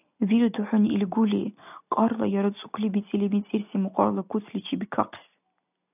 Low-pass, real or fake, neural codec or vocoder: 3.6 kHz; real; none